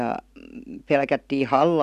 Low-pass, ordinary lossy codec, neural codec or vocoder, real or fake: 14.4 kHz; none; none; real